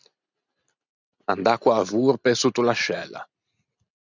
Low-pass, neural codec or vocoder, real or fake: 7.2 kHz; none; real